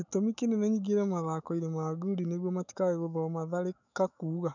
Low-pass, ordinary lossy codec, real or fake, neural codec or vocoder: 7.2 kHz; none; fake; autoencoder, 48 kHz, 128 numbers a frame, DAC-VAE, trained on Japanese speech